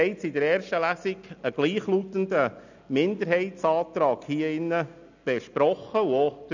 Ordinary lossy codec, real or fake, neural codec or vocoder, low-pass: none; real; none; 7.2 kHz